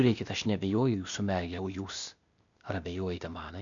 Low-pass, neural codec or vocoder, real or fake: 7.2 kHz; codec, 16 kHz, 0.8 kbps, ZipCodec; fake